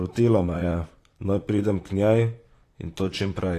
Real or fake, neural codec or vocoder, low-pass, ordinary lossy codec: fake; vocoder, 44.1 kHz, 128 mel bands, Pupu-Vocoder; 14.4 kHz; AAC, 48 kbps